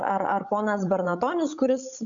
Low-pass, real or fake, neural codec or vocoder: 7.2 kHz; fake; codec, 16 kHz, 16 kbps, FreqCodec, larger model